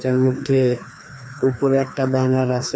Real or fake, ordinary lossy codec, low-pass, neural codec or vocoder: fake; none; none; codec, 16 kHz, 2 kbps, FreqCodec, larger model